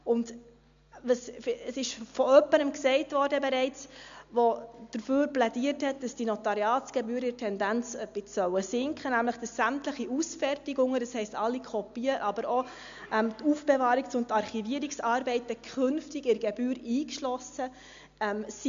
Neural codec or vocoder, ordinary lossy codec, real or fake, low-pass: none; MP3, 64 kbps; real; 7.2 kHz